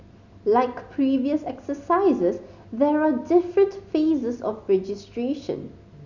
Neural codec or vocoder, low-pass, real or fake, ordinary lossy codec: none; 7.2 kHz; real; none